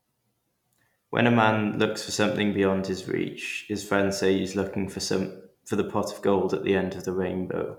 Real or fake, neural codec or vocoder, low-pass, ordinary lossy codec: real; none; 19.8 kHz; none